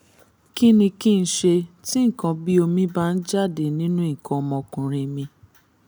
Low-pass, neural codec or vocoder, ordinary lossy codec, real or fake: none; none; none; real